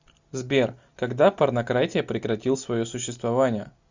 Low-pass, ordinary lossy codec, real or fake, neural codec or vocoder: 7.2 kHz; Opus, 64 kbps; real; none